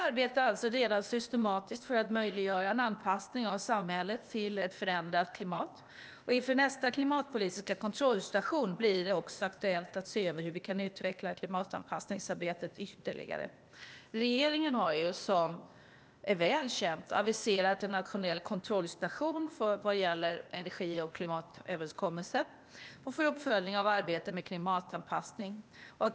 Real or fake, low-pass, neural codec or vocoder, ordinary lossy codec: fake; none; codec, 16 kHz, 0.8 kbps, ZipCodec; none